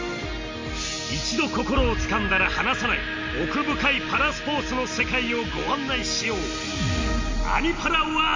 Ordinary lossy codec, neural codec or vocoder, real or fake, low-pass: MP3, 48 kbps; none; real; 7.2 kHz